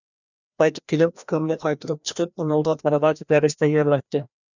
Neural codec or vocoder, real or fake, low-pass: codec, 16 kHz, 1 kbps, FreqCodec, larger model; fake; 7.2 kHz